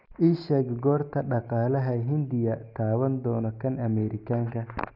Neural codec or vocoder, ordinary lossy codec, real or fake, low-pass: none; none; real; 5.4 kHz